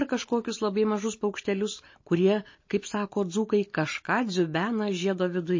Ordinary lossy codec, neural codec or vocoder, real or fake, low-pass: MP3, 32 kbps; none; real; 7.2 kHz